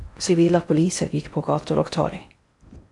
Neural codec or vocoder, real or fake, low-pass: codec, 16 kHz in and 24 kHz out, 0.6 kbps, FocalCodec, streaming, 4096 codes; fake; 10.8 kHz